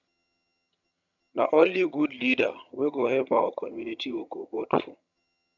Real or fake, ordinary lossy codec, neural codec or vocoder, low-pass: fake; none; vocoder, 22.05 kHz, 80 mel bands, HiFi-GAN; 7.2 kHz